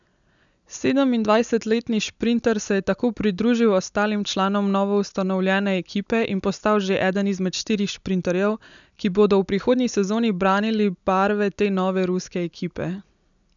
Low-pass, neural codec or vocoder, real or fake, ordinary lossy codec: 7.2 kHz; none; real; none